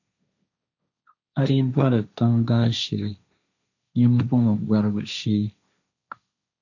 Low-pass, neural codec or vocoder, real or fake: 7.2 kHz; codec, 16 kHz, 1.1 kbps, Voila-Tokenizer; fake